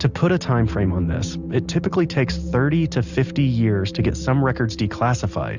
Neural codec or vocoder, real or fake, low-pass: none; real; 7.2 kHz